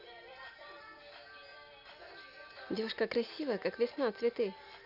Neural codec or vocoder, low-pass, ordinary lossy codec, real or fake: none; 5.4 kHz; none; real